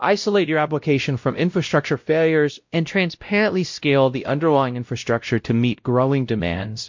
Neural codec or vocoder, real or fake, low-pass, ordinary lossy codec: codec, 16 kHz, 0.5 kbps, X-Codec, WavLM features, trained on Multilingual LibriSpeech; fake; 7.2 kHz; MP3, 48 kbps